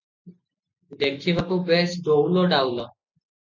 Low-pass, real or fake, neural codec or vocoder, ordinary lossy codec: 7.2 kHz; real; none; MP3, 64 kbps